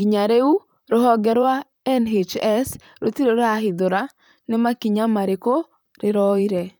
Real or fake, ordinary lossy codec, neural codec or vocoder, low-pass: fake; none; vocoder, 44.1 kHz, 128 mel bands, Pupu-Vocoder; none